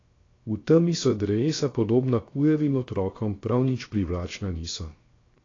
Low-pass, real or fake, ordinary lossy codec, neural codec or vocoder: 7.2 kHz; fake; AAC, 32 kbps; codec, 16 kHz, 0.7 kbps, FocalCodec